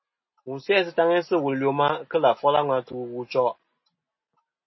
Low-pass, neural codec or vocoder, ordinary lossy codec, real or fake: 7.2 kHz; none; MP3, 24 kbps; real